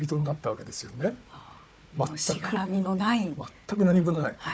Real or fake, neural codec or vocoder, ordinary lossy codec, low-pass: fake; codec, 16 kHz, 4 kbps, FunCodec, trained on Chinese and English, 50 frames a second; none; none